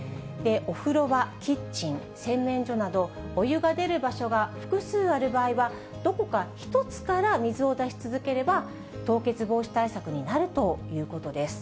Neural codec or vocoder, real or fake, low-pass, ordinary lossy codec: none; real; none; none